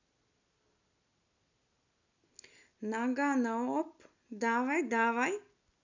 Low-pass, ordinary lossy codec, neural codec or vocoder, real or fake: 7.2 kHz; none; none; real